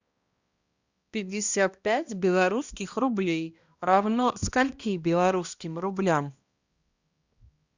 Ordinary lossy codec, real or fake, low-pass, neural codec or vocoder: Opus, 64 kbps; fake; 7.2 kHz; codec, 16 kHz, 1 kbps, X-Codec, HuBERT features, trained on balanced general audio